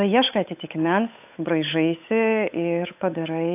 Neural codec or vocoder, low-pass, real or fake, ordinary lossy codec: codec, 16 kHz, 8 kbps, FunCodec, trained on Chinese and English, 25 frames a second; 3.6 kHz; fake; AAC, 32 kbps